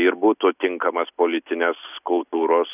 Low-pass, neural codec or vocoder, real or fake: 3.6 kHz; none; real